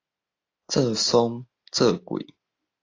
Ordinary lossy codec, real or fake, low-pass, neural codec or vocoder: AAC, 32 kbps; real; 7.2 kHz; none